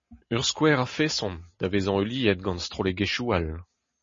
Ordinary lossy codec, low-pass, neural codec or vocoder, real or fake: MP3, 32 kbps; 7.2 kHz; none; real